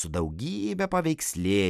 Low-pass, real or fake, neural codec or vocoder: 14.4 kHz; real; none